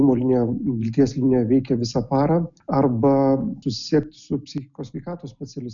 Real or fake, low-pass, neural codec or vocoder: real; 7.2 kHz; none